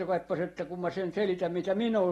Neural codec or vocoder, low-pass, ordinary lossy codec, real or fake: none; 19.8 kHz; AAC, 32 kbps; real